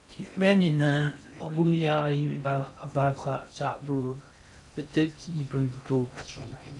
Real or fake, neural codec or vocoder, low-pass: fake; codec, 16 kHz in and 24 kHz out, 0.6 kbps, FocalCodec, streaming, 4096 codes; 10.8 kHz